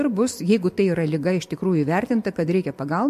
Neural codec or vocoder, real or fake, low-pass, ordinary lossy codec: none; real; 14.4 kHz; MP3, 64 kbps